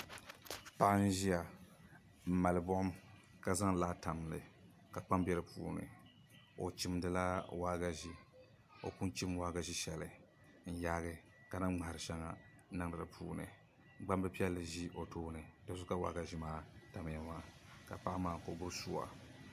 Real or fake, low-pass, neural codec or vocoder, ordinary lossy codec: real; 14.4 kHz; none; AAC, 96 kbps